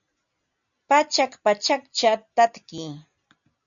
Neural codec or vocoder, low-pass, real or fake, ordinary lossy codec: none; 7.2 kHz; real; MP3, 96 kbps